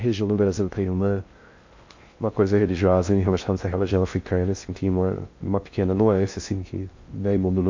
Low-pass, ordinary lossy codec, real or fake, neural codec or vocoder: 7.2 kHz; MP3, 48 kbps; fake; codec, 16 kHz in and 24 kHz out, 0.6 kbps, FocalCodec, streaming, 4096 codes